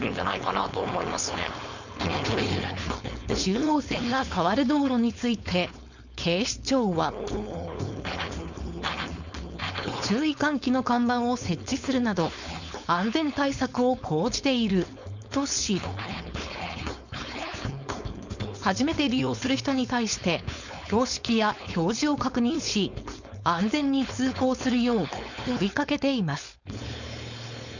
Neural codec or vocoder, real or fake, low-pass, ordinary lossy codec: codec, 16 kHz, 4.8 kbps, FACodec; fake; 7.2 kHz; none